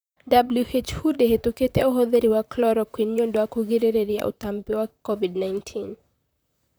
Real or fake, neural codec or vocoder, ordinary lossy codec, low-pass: fake; vocoder, 44.1 kHz, 128 mel bands, Pupu-Vocoder; none; none